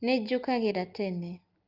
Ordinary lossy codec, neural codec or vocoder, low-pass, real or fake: Opus, 32 kbps; none; 5.4 kHz; real